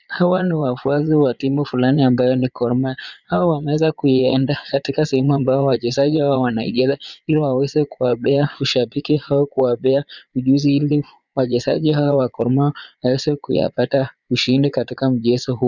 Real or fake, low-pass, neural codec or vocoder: fake; 7.2 kHz; vocoder, 22.05 kHz, 80 mel bands, Vocos